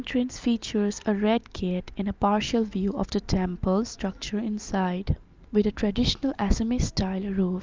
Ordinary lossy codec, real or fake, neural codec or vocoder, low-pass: Opus, 32 kbps; real; none; 7.2 kHz